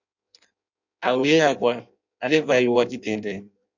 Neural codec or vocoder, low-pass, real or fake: codec, 16 kHz in and 24 kHz out, 0.6 kbps, FireRedTTS-2 codec; 7.2 kHz; fake